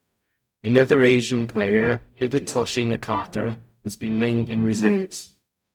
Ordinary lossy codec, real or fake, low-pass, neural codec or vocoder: none; fake; 19.8 kHz; codec, 44.1 kHz, 0.9 kbps, DAC